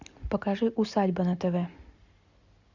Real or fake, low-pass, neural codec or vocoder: real; 7.2 kHz; none